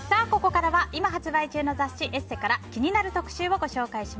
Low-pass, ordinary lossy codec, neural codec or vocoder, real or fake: none; none; none; real